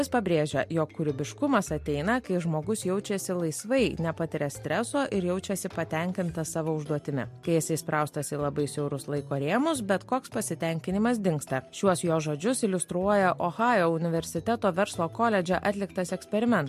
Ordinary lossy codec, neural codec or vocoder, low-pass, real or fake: MP3, 64 kbps; none; 14.4 kHz; real